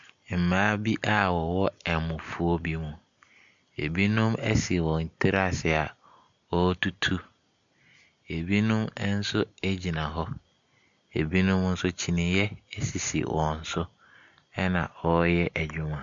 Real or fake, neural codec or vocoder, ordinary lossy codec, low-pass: real; none; MP3, 64 kbps; 7.2 kHz